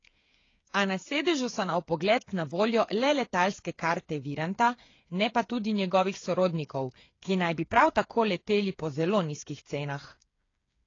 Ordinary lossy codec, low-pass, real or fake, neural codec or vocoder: AAC, 32 kbps; 7.2 kHz; fake; codec, 16 kHz, 8 kbps, FreqCodec, smaller model